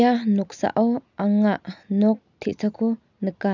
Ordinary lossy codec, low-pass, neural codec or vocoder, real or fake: none; 7.2 kHz; none; real